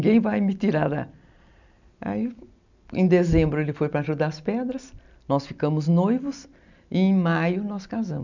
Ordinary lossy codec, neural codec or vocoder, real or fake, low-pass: none; none; real; 7.2 kHz